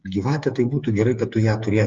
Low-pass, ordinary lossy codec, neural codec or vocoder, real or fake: 7.2 kHz; Opus, 32 kbps; codec, 16 kHz, 8 kbps, FreqCodec, smaller model; fake